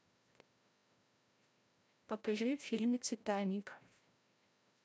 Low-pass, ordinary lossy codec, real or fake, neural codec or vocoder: none; none; fake; codec, 16 kHz, 0.5 kbps, FreqCodec, larger model